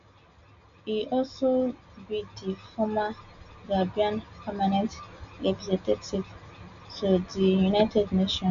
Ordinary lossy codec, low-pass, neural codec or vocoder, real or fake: AAC, 96 kbps; 7.2 kHz; none; real